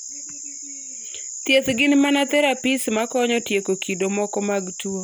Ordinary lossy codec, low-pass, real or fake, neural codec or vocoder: none; none; real; none